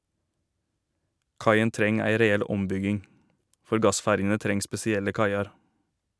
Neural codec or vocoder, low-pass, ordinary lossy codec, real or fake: none; none; none; real